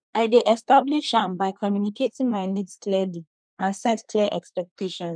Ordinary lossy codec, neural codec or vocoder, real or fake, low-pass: none; codec, 24 kHz, 1 kbps, SNAC; fake; 9.9 kHz